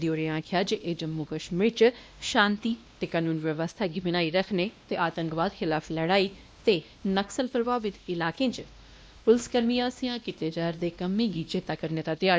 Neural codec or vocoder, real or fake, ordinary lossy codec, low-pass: codec, 16 kHz, 1 kbps, X-Codec, WavLM features, trained on Multilingual LibriSpeech; fake; none; none